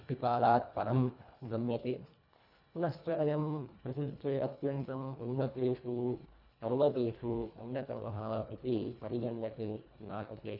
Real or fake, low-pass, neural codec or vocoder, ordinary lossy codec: fake; 5.4 kHz; codec, 24 kHz, 1.5 kbps, HILCodec; none